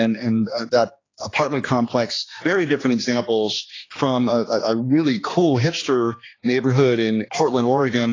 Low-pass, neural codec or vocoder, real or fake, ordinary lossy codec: 7.2 kHz; codec, 16 kHz, 2 kbps, X-Codec, HuBERT features, trained on general audio; fake; AAC, 32 kbps